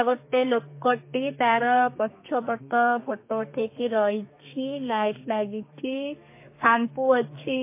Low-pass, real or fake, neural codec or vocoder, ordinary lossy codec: 3.6 kHz; fake; codec, 32 kHz, 1.9 kbps, SNAC; MP3, 24 kbps